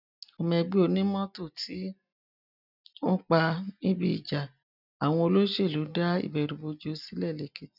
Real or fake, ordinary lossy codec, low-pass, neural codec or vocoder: real; none; 5.4 kHz; none